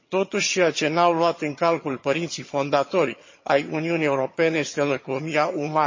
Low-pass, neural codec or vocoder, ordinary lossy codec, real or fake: 7.2 kHz; vocoder, 22.05 kHz, 80 mel bands, HiFi-GAN; MP3, 32 kbps; fake